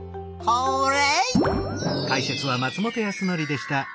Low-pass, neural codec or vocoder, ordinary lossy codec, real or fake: none; none; none; real